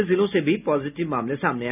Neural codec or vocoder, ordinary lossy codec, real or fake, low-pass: none; none; real; 3.6 kHz